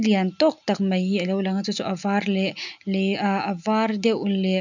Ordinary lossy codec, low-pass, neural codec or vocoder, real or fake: none; 7.2 kHz; none; real